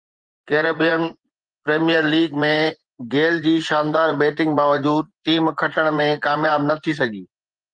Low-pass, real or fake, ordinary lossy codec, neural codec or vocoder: 9.9 kHz; fake; Opus, 24 kbps; vocoder, 24 kHz, 100 mel bands, Vocos